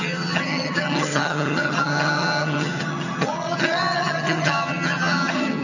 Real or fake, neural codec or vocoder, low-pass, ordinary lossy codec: fake; vocoder, 22.05 kHz, 80 mel bands, HiFi-GAN; 7.2 kHz; none